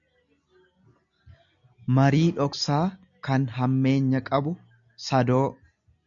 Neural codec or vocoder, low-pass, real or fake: none; 7.2 kHz; real